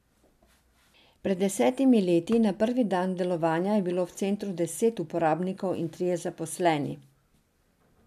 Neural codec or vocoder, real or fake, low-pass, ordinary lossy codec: none; real; 14.4 kHz; MP3, 96 kbps